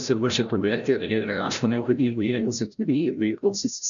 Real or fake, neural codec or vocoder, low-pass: fake; codec, 16 kHz, 0.5 kbps, FreqCodec, larger model; 7.2 kHz